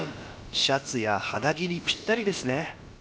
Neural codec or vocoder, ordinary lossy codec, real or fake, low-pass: codec, 16 kHz, about 1 kbps, DyCAST, with the encoder's durations; none; fake; none